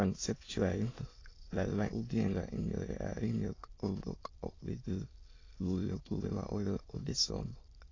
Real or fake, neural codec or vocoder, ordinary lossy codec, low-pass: fake; autoencoder, 22.05 kHz, a latent of 192 numbers a frame, VITS, trained on many speakers; AAC, 32 kbps; 7.2 kHz